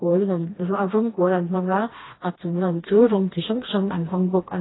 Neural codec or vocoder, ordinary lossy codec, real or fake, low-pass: codec, 16 kHz, 1 kbps, FreqCodec, smaller model; AAC, 16 kbps; fake; 7.2 kHz